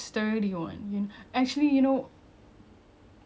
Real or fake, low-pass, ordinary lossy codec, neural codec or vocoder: real; none; none; none